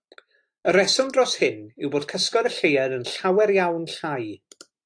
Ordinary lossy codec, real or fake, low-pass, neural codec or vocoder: AAC, 48 kbps; real; 9.9 kHz; none